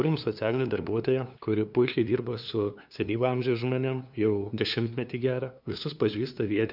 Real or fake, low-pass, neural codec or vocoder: fake; 5.4 kHz; codec, 16 kHz, 2 kbps, FunCodec, trained on LibriTTS, 25 frames a second